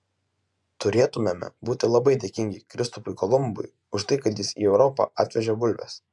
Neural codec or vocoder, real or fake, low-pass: vocoder, 24 kHz, 100 mel bands, Vocos; fake; 10.8 kHz